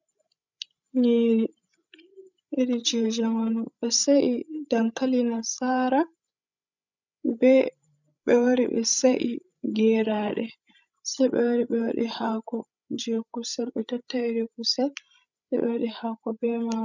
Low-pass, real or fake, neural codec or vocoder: 7.2 kHz; fake; codec, 16 kHz, 16 kbps, FreqCodec, larger model